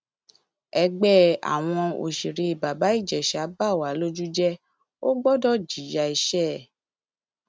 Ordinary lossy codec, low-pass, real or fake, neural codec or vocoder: none; none; real; none